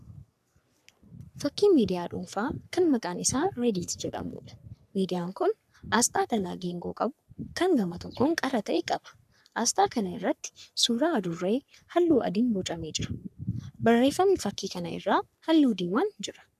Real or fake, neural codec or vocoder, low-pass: fake; codec, 44.1 kHz, 3.4 kbps, Pupu-Codec; 14.4 kHz